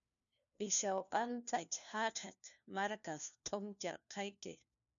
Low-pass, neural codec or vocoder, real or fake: 7.2 kHz; codec, 16 kHz, 1 kbps, FunCodec, trained on LibriTTS, 50 frames a second; fake